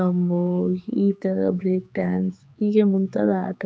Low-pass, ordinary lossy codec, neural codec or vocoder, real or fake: none; none; codec, 16 kHz, 4 kbps, X-Codec, HuBERT features, trained on general audio; fake